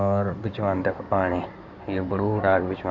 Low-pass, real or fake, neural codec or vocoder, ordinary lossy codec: 7.2 kHz; fake; codec, 16 kHz in and 24 kHz out, 2.2 kbps, FireRedTTS-2 codec; none